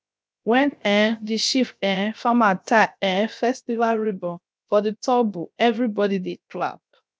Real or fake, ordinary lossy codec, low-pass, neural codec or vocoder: fake; none; none; codec, 16 kHz, 0.7 kbps, FocalCodec